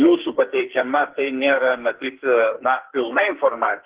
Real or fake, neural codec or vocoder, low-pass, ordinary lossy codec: fake; codec, 44.1 kHz, 2.6 kbps, SNAC; 3.6 kHz; Opus, 16 kbps